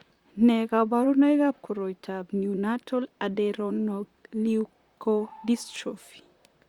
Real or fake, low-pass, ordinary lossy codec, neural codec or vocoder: fake; 19.8 kHz; Opus, 64 kbps; vocoder, 44.1 kHz, 128 mel bands, Pupu-Vocoder